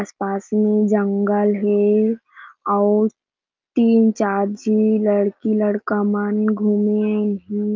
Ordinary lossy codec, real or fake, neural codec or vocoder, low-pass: Opus, 32 kbps; real; none; 7.2 kHz